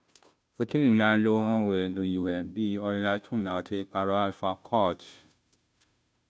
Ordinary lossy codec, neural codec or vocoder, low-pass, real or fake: none; codec, 16 kHz, 0.5 kbps, FunCodec, trained on Chinese and English, 25 frames a second; none; fake